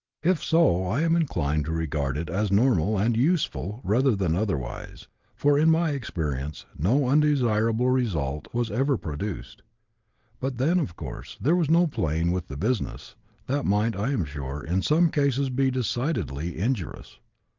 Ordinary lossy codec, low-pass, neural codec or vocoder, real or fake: Opus, 32 kbps; 7.2 kHz; none; real